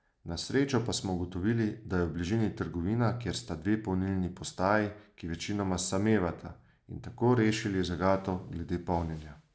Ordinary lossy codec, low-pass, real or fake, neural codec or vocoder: none; none; real; none